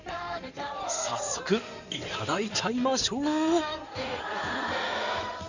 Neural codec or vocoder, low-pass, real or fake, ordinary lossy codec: codec, 16 kHz in and 24 kHz out, 2.2 kbps, FireRedTTS-2 codec; 7.2 kHz; fake; none